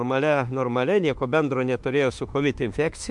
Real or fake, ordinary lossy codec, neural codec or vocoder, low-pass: fake; MP3, 64 kbps; autoencoder, 48 kHz, 32 numbers a frame, DAC-VAE, trained on Japanese speech; 10.8 kHz